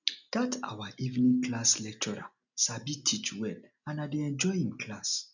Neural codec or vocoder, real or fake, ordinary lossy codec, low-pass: none; real; none; 7.2 kHz